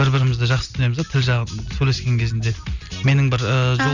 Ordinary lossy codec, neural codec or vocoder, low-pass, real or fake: none; none; 7.2 kHz; real